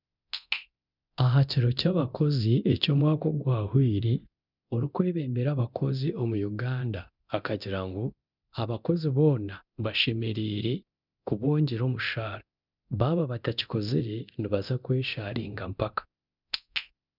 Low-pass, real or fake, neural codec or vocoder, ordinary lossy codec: 5.4 kHz; fake; codec, 24 kHz, 0.9 kbps, DualCodec; none